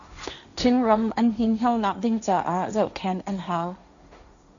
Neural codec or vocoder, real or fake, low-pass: codec, 16 kHz, 1.1 kbps, Voila-Tokenizer; fake; 7.2 kHz